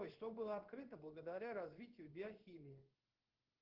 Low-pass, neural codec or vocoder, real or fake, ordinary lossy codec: 5.4 kHz; codec, 16 kHz in and 24 kHz out, 1 kbps, XY-Tokenizer; fake; Opus, 16 kbps